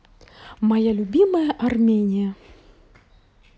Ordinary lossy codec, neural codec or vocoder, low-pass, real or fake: none; none; none; real